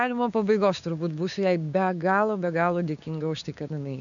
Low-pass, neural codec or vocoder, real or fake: 7.2 kHz; codec, 16 kHz, 6 kbps, DAC; fake